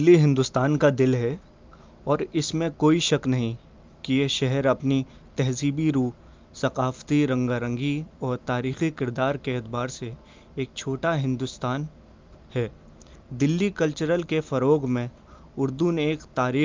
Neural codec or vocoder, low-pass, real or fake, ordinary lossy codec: none; 7.2 kHz; real; Opus, 24 kbps